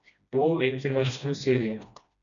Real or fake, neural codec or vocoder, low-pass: fake; codec, 16 kHz, 1 kbps, FreqCodec, smaller model; 7.2 kHz